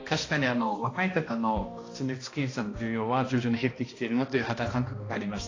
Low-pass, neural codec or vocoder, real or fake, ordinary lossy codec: 7.2 kHz; codec, 16 kHz, 1 kbps, X-Codec, HuBERT features, trained on balanced general audio; fake; AAC, 32 kbps